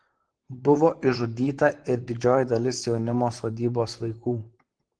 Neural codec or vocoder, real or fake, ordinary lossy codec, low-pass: vocoder, 44.1 kHz, 128 mel bands, Pupu-Vocoder; fake; Opus, 16 kbps; 9.9 kHz